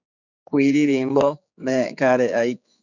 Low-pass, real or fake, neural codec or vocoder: 7.2 kHz; fake; codec, 16 kHz, 4 kbps, X-Codec, HuBERT features, trained on general audio